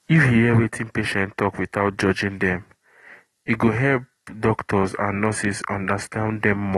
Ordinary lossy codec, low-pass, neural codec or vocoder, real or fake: AAC, 32 kbps; 19.8 kHz; none; real